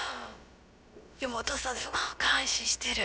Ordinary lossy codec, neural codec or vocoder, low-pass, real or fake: none; codec, 16 kHz, about 1 kbps, DyCAST, with the encoder's durations; none; fake